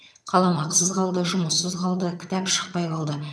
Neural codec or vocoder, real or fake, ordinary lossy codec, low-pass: vocoder, 22.05 kHz, 80 mel bands, HiFi-GAN; fake; none; none